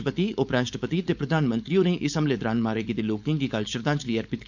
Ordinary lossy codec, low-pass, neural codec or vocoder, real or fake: none; 7.2 kHz; codec, 16 kHz, 4.8 kbps, FACodec; fake